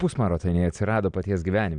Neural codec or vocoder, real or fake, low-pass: none; real; 9.9 kHz